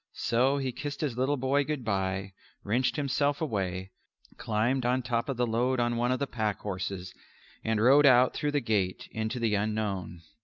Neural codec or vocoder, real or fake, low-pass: none; real; 7.2 kHz